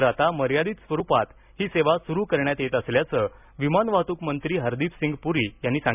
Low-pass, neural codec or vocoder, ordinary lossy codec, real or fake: 3.6 kHz; none; none; real